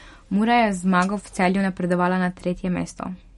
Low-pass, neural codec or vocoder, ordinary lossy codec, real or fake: 19.8 kHz; none; MP3, 48 kbps; real